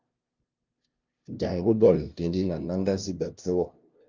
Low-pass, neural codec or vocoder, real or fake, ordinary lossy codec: 7.2 kHz; codec, 16 kHz, 0.5 kbps, FunCodec, trained on LibriTTS, 25 frames a second; fake; Opus, 32 kbps